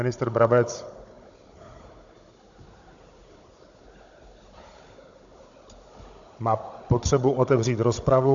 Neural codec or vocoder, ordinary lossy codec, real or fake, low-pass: codec, 16 kHz, 16 kbps, FunCodec, trained on Chinese and English, 50 frames a second; AAC, 64 kbps; fake; 7.2 kHz